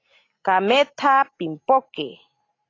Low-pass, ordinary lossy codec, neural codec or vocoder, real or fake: 7.2 kHz; AAC, 32 kbps; none; real